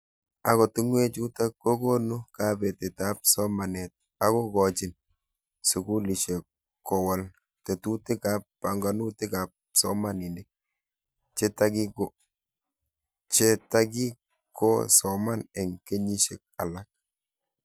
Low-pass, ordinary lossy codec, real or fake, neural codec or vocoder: none; none; real; none